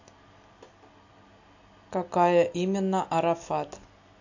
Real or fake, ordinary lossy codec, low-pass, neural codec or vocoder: real; AAC, 48 kbps; 7.2 kHz; none